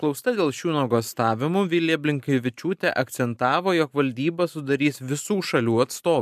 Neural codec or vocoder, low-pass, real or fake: none; 14.4 kHz; real